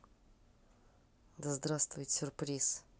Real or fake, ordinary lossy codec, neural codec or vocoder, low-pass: real; none; none; none